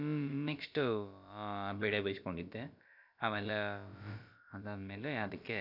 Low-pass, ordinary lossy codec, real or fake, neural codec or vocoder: 5.4 kHz; none; fake; codec, 16 kHz, about 1 kbps, DyCAST, with the encoder's durations